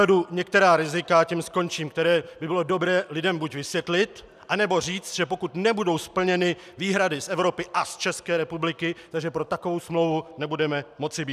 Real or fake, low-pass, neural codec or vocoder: real; 14.4 kHz; none